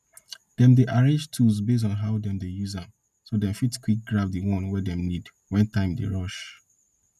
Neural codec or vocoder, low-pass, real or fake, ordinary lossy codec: none; 14.4 kHz; real; none